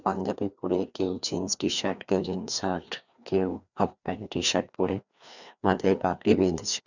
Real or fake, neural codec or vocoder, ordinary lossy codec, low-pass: fake; codec, 16 kHz, 2 kbps, FreqCodec, larger model; none; 7.2 kHz